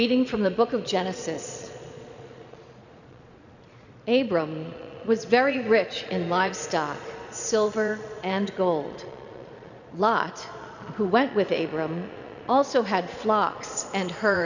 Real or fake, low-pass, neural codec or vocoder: fake; 7.2 kHz; vocoder, 22.05 kHz, 80 mel bands, Vocos